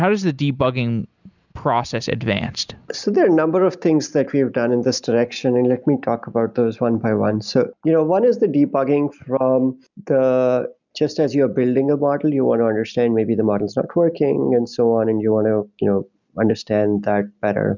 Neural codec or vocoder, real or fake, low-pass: none; real; 7.2 kHz